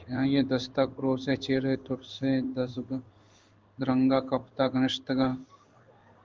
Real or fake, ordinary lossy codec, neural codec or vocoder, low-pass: fake; Opus, 32 kbps; codec, 16 kHz in and 24 kHz out, 1 kbps, XY-Tokenizer; 7.2 kHz